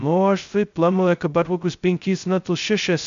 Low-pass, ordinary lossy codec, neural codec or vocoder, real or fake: 7.2 kHz; MP3, 64 kbps; codec, 16 kHz, 0.2 kbps, FocalCodec; fake